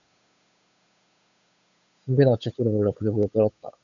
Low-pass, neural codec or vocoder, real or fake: 7.2 kHz; codec, 16 kHz, 8 kbps, FunCodec, trained on Chinese and English, 25 frames a second; fake